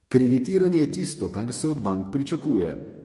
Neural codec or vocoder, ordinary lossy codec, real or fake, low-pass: codec, 44.1 kHz, 2.6 kbps, DAC; MP3, 48 kbps; fake; 14.4 kHz